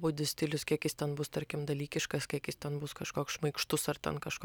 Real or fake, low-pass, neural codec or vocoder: real; 19.8 kHz; none